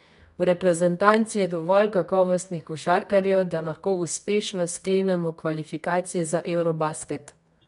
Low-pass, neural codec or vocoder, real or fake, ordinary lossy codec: 10.8 kHz; codec, 24 kHz, 0.9 kbps, WavTokenizer, medium music audio release; fake; none